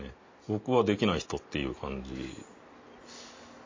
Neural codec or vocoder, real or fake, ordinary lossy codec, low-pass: none; real; MP3, 32 kbps; 7.2 kHz